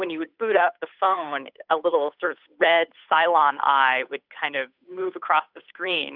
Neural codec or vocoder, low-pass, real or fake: codec, 16 kHz, 8 kbps, FunCodec, trained on Chinese and English, 25 frames a second; 5.4 kHz; fake